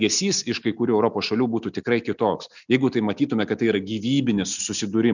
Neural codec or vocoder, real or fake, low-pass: none; real; 7.2 kHz